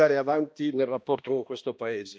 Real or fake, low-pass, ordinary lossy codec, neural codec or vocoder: fake; none; none; codec, 16 kHz, 1 kbps, X-Codec, HuBERT features, trained on balanced general audio